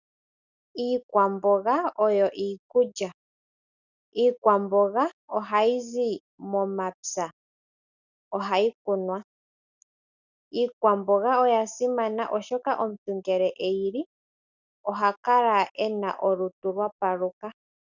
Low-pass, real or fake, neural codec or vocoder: 7.2 kHz; real; none